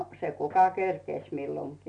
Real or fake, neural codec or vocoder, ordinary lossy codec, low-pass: real; none; none; 9.9 kHz